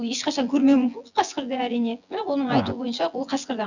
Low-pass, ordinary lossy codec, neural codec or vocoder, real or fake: 7.2 kHz; none; vocoder, 24 kHz, 100 mel bands, Vocos; fake